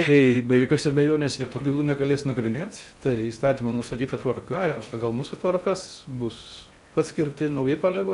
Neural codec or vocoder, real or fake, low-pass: codec, 16 kHz in and 24 kHz out, 0.6 kbps, FocalCodec, streaming, 4096 codes; fake; 10.8 kHz